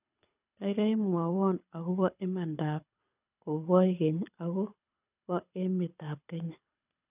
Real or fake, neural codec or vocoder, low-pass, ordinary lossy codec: fake; codec, 24 kHz, 6 kbps, HILCodec; 3.6 kHz; none